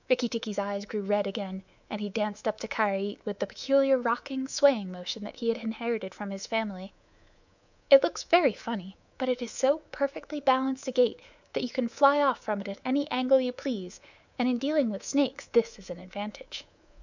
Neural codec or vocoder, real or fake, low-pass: codec, 24 kHz, 3.1 kbps, DualCodec; fake; 7.2 kHz